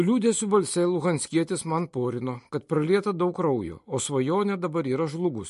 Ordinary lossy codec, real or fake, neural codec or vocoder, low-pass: MP3, 48 kbps; real; none; 14.4 kHz